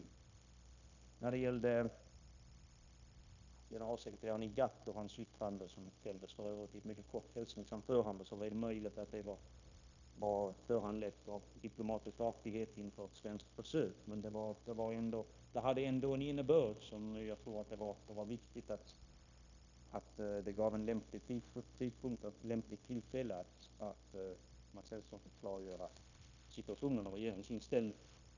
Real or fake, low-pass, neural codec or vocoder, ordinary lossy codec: fake; 7.2 kHz; codec, 16 kHz, 0.9 kbps, LongCat-Audio-Codec; none